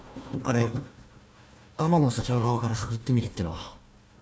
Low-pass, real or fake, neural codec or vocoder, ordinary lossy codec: none; fake; codec, 16 kHz, 1 kbps, FunCodec, trained on Chinese and English, 50 frames a second; none